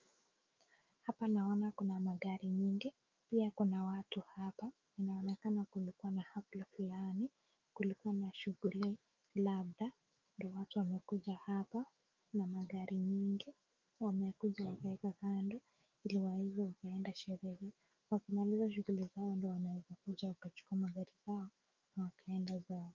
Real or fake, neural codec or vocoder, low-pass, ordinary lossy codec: fake; autoencoder, 48 kHz, 128 numbers a frame, DAC-VAE, trained on Japanese speech; 7.2 kHz; Opus, 24 kbps